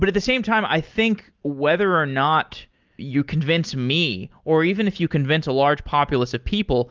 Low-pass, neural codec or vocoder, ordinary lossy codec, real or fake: 7.2 kHz; none; Opus, 32 kbps; real